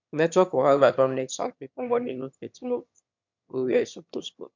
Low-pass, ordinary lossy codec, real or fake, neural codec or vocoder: 7.2 kHz; MP3, 64 kbps; fake; autoencoder, 22.05 kHz, a latent of 192 numbers a frame, VITS, trained on one speaker